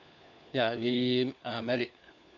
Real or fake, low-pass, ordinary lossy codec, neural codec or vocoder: fake; 7.2 kHz; none; codec, 16 kHz, 4 kbps, FunCodec, trained on LibriTTS, 50 frames a second